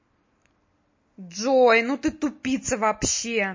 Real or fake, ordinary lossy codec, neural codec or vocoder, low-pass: real; MP3, 32 kbps; none; 7.2 kHz